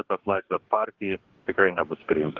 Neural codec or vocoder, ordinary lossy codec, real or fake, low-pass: codec, 44.1 kHz, 2.6 kbps, DAC; Opus, 16 kbps; fake; 7.2 kHz